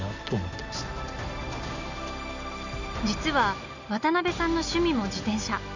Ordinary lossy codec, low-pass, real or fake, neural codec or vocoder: none; 7.2 kHz; real; none